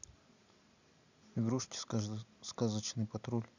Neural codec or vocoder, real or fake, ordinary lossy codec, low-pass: none; real; none; 7.2 kHz